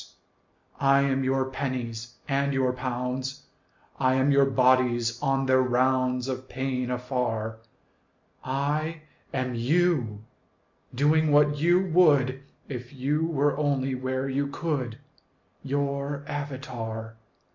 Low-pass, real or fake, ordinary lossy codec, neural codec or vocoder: 7.2 kHz; real; MP3, 64 kbps; none